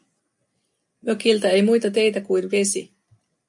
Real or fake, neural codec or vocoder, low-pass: real; none; 10.8 kHz